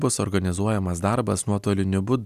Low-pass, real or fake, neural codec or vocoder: 14.4 kHz; real; none